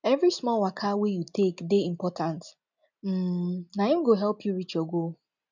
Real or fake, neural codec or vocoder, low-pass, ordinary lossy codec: real; none; 7.2 kHz; none